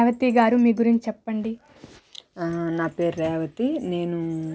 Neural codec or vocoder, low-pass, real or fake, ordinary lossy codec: none; none; real; none